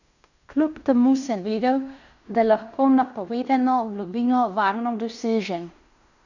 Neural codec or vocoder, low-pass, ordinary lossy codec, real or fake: codec, 16 kHz in and 24 kHz out, 0.9 kbps, LongCat-Audio-Codec, fine tuned four codebook decoder; 7.2 kHz; none; fake